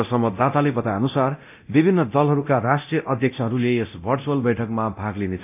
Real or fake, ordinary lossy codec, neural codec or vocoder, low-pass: fake; none; codec, 24 kHz, 0.9 kbps, DualCodec; 3.6 kHz